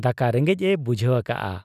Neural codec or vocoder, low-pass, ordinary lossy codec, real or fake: none; 14.4 kHz; none; real